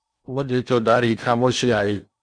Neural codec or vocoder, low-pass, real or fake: codec, 16 kHz in and 24 kHz out, 0.8 kbps, FocalCodec, streaming, 65536 codes; 9.9 kHz; fake